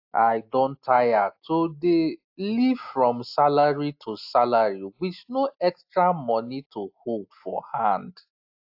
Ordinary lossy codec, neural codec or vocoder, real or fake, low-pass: AAC, 48 kbps; none; real; 5.4 kHz